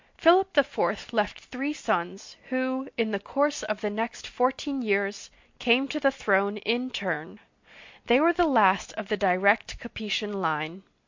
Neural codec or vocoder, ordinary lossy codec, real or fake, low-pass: none; MP3, 48 kbps; real; 7.2 kHz